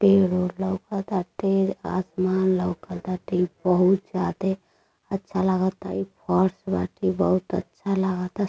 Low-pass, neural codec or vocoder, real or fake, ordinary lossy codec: none; none; real; none